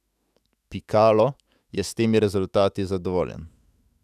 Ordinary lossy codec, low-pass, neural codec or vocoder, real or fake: none; 14.4 kHz; autoencoder, 48 kHz, 128 numbers a frame, DAC-VAE, trained on Japanese speech; fake